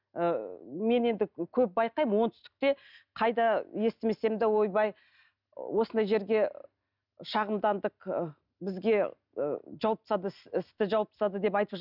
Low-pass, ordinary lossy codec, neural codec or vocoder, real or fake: 5.4 kHz; none; none; real